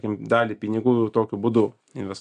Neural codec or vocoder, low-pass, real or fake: none; 9.9 kHz; real